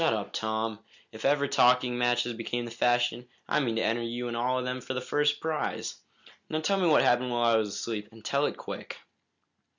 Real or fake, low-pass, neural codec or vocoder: real; 7.2 kHz; none